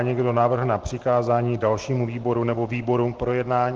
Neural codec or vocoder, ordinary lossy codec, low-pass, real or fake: none; Opus, 16 kbps; 7.2 kHz; real